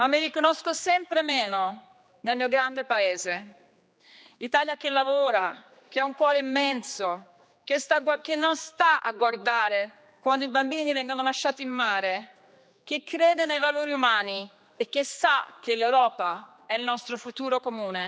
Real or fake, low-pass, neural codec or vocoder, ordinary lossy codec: fake; none; codec, 16 kHz, 2 kbps, X-Codec, HuBERT features, trained on general audio; none